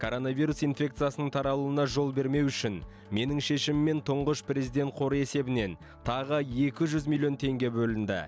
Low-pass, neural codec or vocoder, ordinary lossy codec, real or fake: none; none; none; real